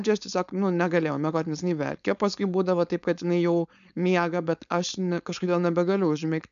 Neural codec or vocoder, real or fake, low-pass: codec, 16 kHz, 4.8 kbps, FACodec; fake; 7.2 kHz